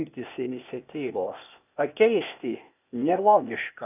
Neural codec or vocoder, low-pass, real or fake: codec, 16 kHz, 0.8 kbps, ZipCodec; 3.6 kHz; fake